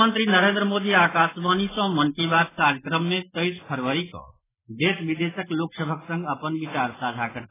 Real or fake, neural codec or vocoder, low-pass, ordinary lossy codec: real; none; 3.6 kHz; AAC, 16 kbps